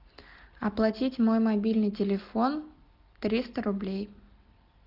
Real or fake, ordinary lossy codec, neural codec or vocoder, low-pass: real; Opus, 24 kbps; none; 5.4 kHz